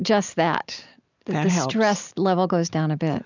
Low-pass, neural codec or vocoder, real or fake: 7.2 kHz; none; real